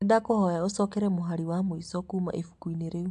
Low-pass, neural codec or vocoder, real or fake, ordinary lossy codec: 10.8 kHz; none; real; AAC, 64 kbps